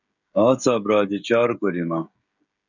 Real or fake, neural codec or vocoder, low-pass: fake; codec, 16 kHz, 16 kbps, FreqCodec, smaller model; 7.2 kHz